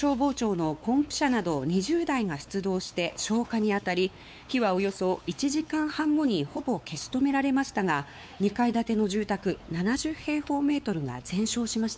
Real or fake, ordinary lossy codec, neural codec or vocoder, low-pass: fake; none; codec, 16 kHz, 4 kbps, X-Codec, WavLM features, trained on Multilingual LibriSpeech; none